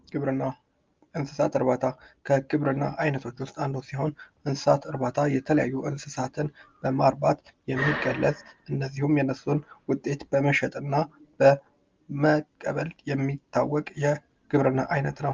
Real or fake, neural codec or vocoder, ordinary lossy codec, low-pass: real; none; Opus, 24 kbps; 7.2 kHz